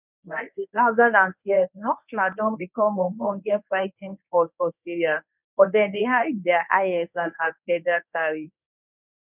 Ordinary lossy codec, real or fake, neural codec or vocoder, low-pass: none; fake; codec, 24 kHz, 0.9 kbps, WavTokenizer, medium speech release version 1; 3.6 kHz